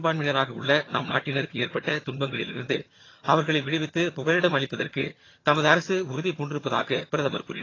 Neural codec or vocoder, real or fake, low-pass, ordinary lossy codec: vocoder, 22.05 kHz, 80 mel bands, HiFi-GAN; fake; 7.2 kHz; AAC, 32 kbps